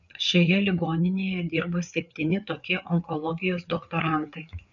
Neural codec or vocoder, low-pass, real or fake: codec, 16 kHz, 4 kbps, FreqCodec, larger model; 7.2 kHz; fake